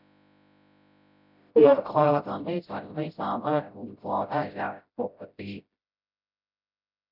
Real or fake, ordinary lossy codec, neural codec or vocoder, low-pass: fake; none; codec, 16 kHz, 0.5 kbps, FreqCodec, smaller model; 5.4 kHz